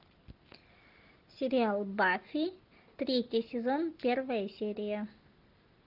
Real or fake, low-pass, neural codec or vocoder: real; 5.4 kHz; none